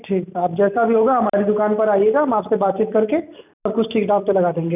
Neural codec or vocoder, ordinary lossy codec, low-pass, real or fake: none; none; 3.6 kHz; real